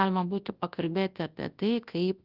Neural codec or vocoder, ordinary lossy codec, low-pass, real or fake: codec, 24 kHz, 0.9 kbps, WavTokenizer, large speech release; Opus, 32 kbps; 5.4 kHz; fake